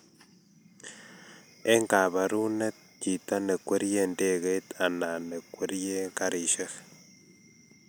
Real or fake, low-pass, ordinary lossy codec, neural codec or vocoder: real; none; none; none